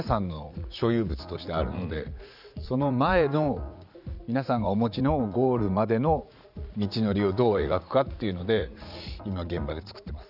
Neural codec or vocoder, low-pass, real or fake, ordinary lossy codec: vocoder, 44.1 kHz, 80 mel bands, Vocos; 5.4 kHz; fake; none